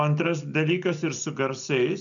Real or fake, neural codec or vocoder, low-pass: real; none; 7.2 kHz